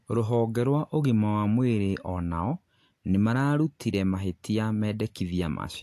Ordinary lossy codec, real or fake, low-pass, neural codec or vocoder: AAC, 64 kbps; real; 14.4 kHz; none